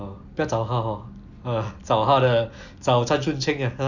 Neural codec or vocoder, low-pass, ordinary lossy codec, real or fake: none; 7.2 kHz; none; real